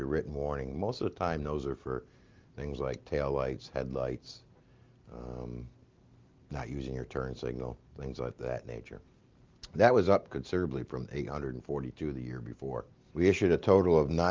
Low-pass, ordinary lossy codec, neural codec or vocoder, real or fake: 7.2 kHz; Opus, 32 kbps; none; real